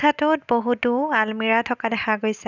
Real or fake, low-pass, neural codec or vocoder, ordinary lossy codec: real; 7.2 kHz; none; none